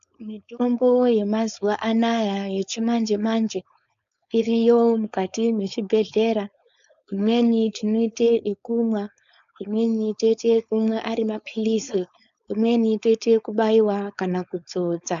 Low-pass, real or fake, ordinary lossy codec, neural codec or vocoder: 7.2 kHz; fake; AAC, 96 kbps; codec, 16 kHz, 4.8 kbps, FACodec